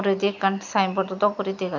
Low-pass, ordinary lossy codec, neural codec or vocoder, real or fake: 7.2 kHz; none; none; real